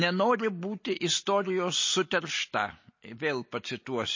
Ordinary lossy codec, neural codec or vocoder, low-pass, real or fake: MP3, 32 kbps; none; 7.2 kHz; real